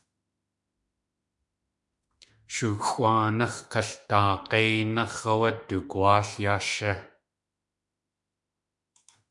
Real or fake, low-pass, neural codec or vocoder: fake; 10.8 kHz; autoencoder, 48 kHz, 32 numbers a frame, DAC-VAE, trained on Japanese speech